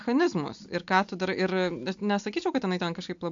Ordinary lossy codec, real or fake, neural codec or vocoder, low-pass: AAC, 64 kbps; real; none; 7.2 kHz